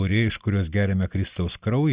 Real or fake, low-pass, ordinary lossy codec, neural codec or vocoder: real; 3.6 kHz; Opus, 64 kbps; none